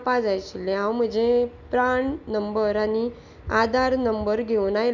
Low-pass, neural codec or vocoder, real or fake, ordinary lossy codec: 7.2 kHz; none; real; none